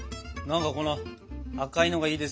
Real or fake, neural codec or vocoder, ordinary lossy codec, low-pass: real; none; none; none